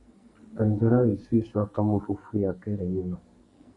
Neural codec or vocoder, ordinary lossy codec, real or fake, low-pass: codec, 44.1 kHz, 2.6 kbps, SNAC; Opus, 64 kbps; fake; 10.8 kHz